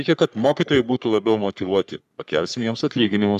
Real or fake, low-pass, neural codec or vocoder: fake; 14.4 kHz; codec, 44.1 kHz, 3.4 kbps, Pupu-Codec